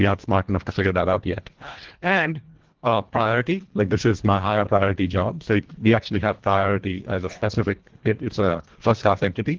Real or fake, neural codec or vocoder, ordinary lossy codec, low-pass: fake; codec, 24 kHz, 1.5 kbps, HILCodec; Opus, 16 kbps; 7.2 kHz